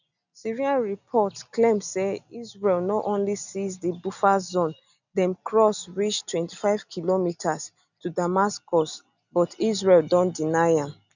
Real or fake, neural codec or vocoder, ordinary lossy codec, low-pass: real; none; none; 7.2 kHz